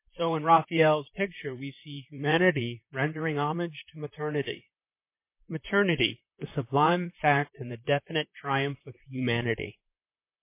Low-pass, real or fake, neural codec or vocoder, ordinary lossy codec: 3.6 kHz; fake; vocoder, 44.1 kHz, 128 mel bands, Pupu-Vocoder; MP3, 24 kbps